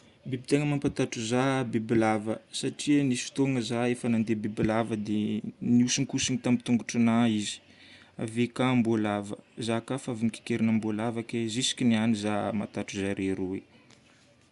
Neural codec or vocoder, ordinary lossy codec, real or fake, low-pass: none; Opus, 64 kbps; real; 10.8 kHz